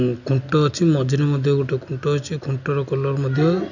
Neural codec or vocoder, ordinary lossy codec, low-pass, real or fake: none; none; 7.2 kHz; real